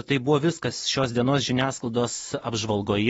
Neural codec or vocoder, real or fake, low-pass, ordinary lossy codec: vocoder, 24 kHz, 100 mel bands, Vocos; fake; 10.8 kHz; AAC, 24 kbps